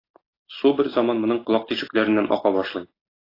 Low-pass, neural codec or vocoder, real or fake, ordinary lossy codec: 5.4 kHz; none; real; AAC, 24 kbps